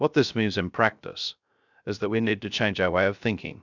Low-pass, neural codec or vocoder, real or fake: 7.2 kHz; codec, 16 kHz, 0.3 kbps, FocalCodec; fake